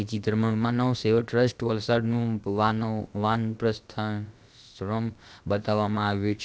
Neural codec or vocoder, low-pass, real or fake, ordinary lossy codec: codec, 16 kHz, about 1 kbps, DyCAST, with the encoder's durations; none; fake; none